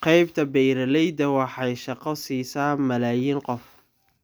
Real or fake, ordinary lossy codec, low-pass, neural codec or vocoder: real; none; none; none